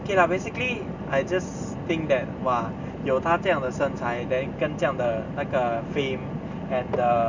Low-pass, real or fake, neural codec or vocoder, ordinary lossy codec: 7.2 kHz; real; none; none